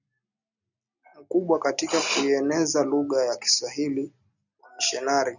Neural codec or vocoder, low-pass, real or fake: none; 7.2 kHz; real